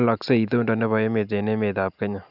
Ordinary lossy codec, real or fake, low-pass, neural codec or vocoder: none; real; 5.4 kHz; none